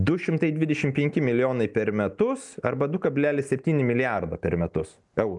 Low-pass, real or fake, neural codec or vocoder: 10.8 kHz; real; none